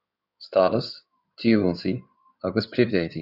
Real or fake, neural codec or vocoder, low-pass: fake; codec, 16 kHz in and 24 kHz out, 2.2 kbps, FireRedTTS-2 codec; 5.4 kHz